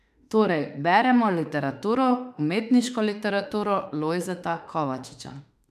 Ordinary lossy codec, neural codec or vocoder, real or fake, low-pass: none; autoencoder, 48 kHz, 32 numbers a frame, DAC-VAE, trained on Japanese speech; fake; 14.4 kHz